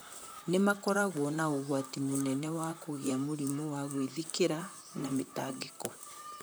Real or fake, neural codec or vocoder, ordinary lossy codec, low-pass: fake; vocoder, 44.1 kHz, 128 mel bands, Pupu-Vocoder; none; none